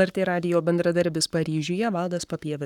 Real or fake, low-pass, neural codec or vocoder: fake; 19.8 kHz; codec, 44.1 kHz, 7.8 kbps, Pupu-Codec